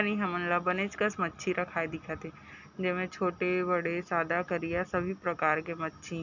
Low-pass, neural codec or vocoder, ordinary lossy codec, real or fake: 7.2 kHz; none; none; real